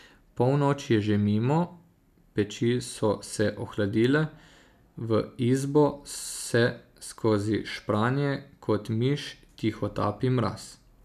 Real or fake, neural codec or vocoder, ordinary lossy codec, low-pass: real; none; none; 14.4 kHz